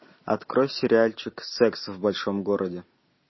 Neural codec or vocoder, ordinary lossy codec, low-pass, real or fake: none; MP3, 24 kbps; 7.2 kHz; real